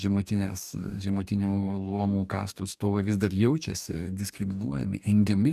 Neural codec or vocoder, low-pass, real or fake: codec, 44.1 kHz, 2.6 kbps, DAC; 14.4 kHz; fake